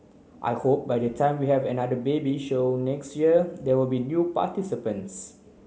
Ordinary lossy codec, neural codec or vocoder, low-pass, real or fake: none; none; none; real